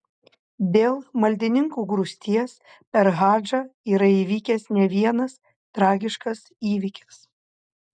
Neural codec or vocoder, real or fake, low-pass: none; real; 9.9 kHz